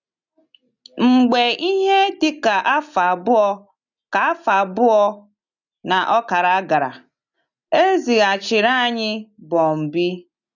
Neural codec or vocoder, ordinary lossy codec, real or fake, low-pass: none; none; real; 7.2 kHz